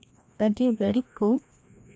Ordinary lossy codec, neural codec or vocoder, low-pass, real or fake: none; codec, 16 kHz, 1 kbps, FreqCodec, larger model; none; fake